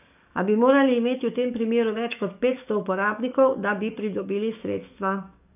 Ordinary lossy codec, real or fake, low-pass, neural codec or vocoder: none; fake; 3.6 kHz; codec, 44.1 kHz, 7.8 kbps, DAC